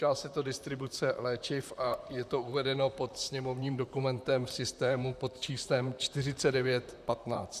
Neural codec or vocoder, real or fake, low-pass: vocoder, 44.1 kHz, 128 mel bands, Pupu-Vocoder; fake; 14.4 kHz